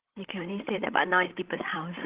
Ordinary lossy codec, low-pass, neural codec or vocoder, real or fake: Opus, 16 kbps; 3.6 kHz; codec, 16 kHz, 16 kbps, FreqCodec, larger model; fake